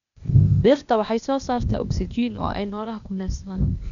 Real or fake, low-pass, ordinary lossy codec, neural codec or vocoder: fake; 7.2 kHz; none; codec, 16 kHz, 0.8 kbps, ZipCodec